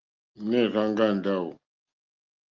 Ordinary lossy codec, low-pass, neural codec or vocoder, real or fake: Opus, 32 kbps; 7.2 kHz; none; real